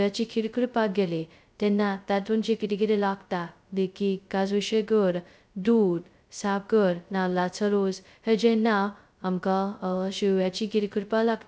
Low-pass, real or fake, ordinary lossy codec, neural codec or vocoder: none; fake; none; codec, 16 kHz, 0.2 kbps, FocalCodec